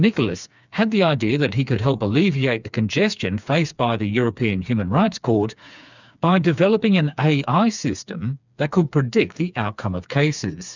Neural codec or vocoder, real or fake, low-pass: codec, 16 kHz, 4 kbps, FreqCodec, smaller model; fake; 7.2 kHz